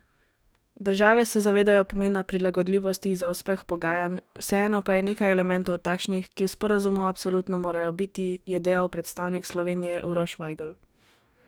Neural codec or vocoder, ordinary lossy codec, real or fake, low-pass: codec, 44.1 kHz, 2.6 kbps, DAC; none; fake; none